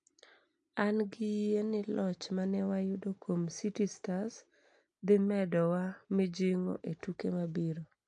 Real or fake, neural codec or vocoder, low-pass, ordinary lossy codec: real; none; 9.9 kHz; AAC, 64 kbps